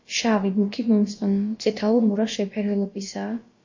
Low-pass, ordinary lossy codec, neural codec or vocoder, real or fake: 7.2 kHz; MP3, 32 kbps; codec, 16 kHz, about 1 kbps, DyCAST, with the encoder's durations; fake